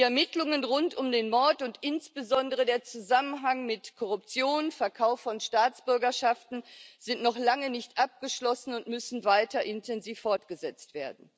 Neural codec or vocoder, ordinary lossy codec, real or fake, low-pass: none; none; real; none